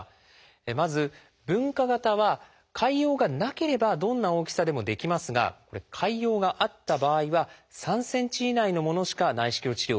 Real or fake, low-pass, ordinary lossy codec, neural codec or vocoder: real; none; none; none